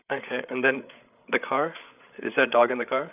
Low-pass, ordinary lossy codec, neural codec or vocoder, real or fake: 3.6 kHz; none; codec, 16 kHz, 8 kbps, FreqCodec, larger model; fake